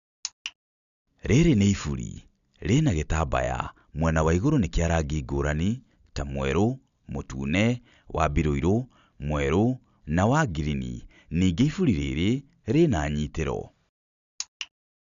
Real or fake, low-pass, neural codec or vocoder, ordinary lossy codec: real; 7.2 kHz; none; none